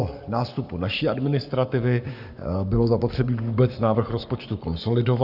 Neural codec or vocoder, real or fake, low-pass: codec, 44.1 kHz, 7.8 kbps, Pupu-Codec; fake; 5.4 kHz